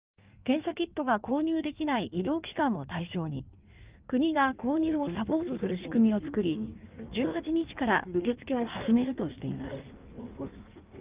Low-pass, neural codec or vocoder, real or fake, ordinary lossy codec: 3.6 kHz; codec, 16 kHz in and 24 kHz out, 1.1 kbps, FireRedTTS-2 codec; fake; Opus, 24 kbps